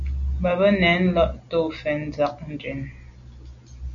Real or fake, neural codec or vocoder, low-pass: real; none; 7.2 kHz